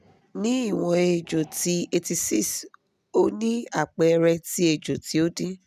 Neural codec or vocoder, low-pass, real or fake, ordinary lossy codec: none; 14.4 kHz; real; none